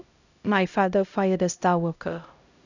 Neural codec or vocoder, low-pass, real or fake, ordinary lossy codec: codec, 16 kHz, 0.5 kbps, X-Codec, HuBERT features, trained on LibriSpeech; 7.2 kHz; fake; none